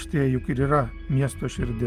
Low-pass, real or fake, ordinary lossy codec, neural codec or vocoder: 14.4 kHz; real; Opus, 32 kbps; none